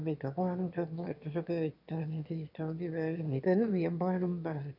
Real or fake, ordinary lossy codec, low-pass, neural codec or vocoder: fake; none; 5.4 kHz; autoencoder, 22.05 kHz, a latent of 192 numbers a frame, VITS, trained on one speaker